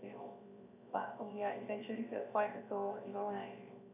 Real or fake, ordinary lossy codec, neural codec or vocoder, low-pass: fake; none; codec, 16 kHz, 0.7 kbps, FocalCodec; 3.6 kHz